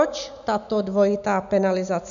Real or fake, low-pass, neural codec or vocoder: real; 7.2 kHz; none